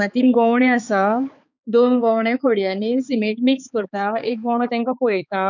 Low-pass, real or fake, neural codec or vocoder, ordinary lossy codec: 7.2 kHz; fake; codec, 16 kHz, 4 kbps, X-Codec, HuBERT features, trained on balanced general audio; none